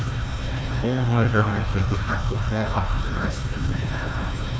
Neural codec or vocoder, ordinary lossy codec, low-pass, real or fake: codec, 16 kHz, 1 kbps, FunCodec, trained on Chinese and English, 50 frames a second; none; none; fake